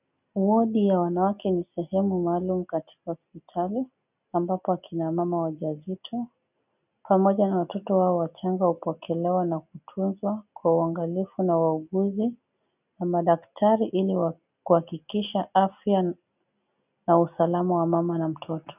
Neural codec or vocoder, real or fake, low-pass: none; real; 3.6 kHz